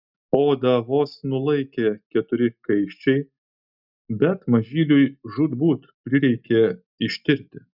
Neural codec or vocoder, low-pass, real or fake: none; 5.4 kHz; real